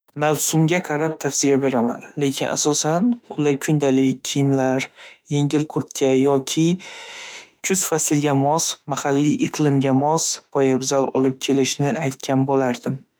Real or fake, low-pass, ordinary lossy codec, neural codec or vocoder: fake; none; none; autoencoder, 48 kHz, 32 numbers a frame, DAC-VAE, trained on Japanese speech